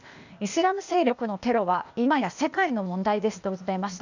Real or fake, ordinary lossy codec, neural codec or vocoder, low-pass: fake; none; codec, 16 kHz, 0.8 kbps, ZipCodec; 7.2 kHz